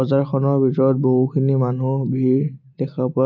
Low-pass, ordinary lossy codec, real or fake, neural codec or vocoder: 7.2 kHz; none; real; none